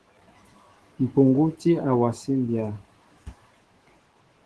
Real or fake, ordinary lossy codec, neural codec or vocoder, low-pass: fake; Opus, 16 kbps; autoencoder, 48 kHz, 128 numbers a frame, DAC-VAE, trained on Japanese speech; 10.8 kHz